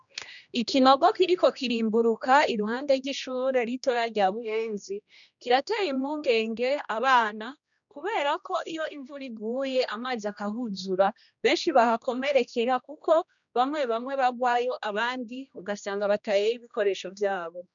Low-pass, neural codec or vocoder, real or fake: 7.2 kHz; codec, 16 kHz, 1 kbps, X-Codec, HuBERT features, trained on general audio; fake